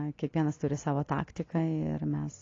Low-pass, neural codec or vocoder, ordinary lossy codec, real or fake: 7.2 kHz; none; AAC, 32 kbps; real